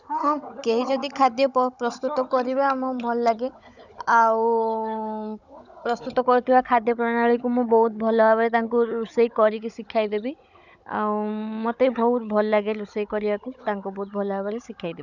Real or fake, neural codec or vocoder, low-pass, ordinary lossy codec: fake; codec, 16 kHz, 16 kbps, FunCodec, trained on Chinese and English, 50 frames a second; 7.2 kHz; none